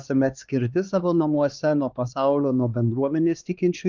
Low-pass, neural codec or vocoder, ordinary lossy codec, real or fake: 7.2 kHz; codec, 16 kHz, 2 kbps, X-Codec, HuBERT features, trained on LibriSpeech; Opus, 24 kbps; fake